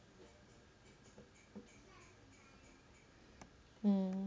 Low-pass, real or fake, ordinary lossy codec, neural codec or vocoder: none; real; none; none